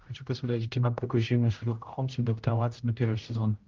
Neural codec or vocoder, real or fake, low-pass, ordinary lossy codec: codec, 16 kHz, 0.5 kbps, X-Codec, HuBERT features, trained on general audio; fake; 7.2 kHz; Opus, 32 kbps